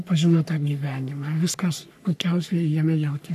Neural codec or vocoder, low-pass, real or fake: codec, 44.1 kHz, 3.4 kbps, Pupu-Codec; 14.4 kHz; fake